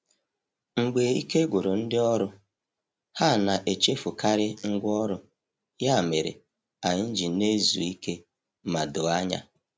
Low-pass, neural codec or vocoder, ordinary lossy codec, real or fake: none; none; none; real